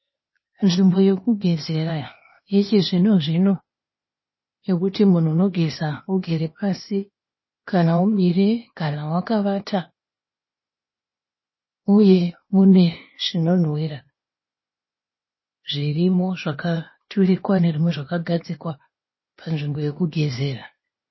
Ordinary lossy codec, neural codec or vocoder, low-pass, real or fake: MP3, 24 kbps; codec, 16 kHz, 0.8 kbps, ZipCodec; 7.2 kHz; fake